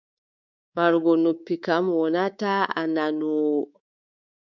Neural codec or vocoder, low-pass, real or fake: codec, 24 kHz, 3.1 kbps, DualCodec; 7.2 kHz; fake